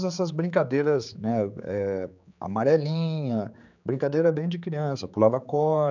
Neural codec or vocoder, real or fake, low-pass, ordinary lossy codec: codec, 16 kHz, 4 kbps, X-Codec, HuBERT features, trained on general audio; fake; 7.2 kHz; none